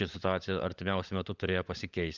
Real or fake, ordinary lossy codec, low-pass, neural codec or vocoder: fake; Opus, 32 kbps; 7.2 kHz; codec, 16 kHz, 16 kbps, FunCodec, trained on LibriTTS, 50 frames a second